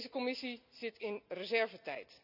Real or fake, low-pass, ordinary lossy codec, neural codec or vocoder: real; 5.4 kHz; none; none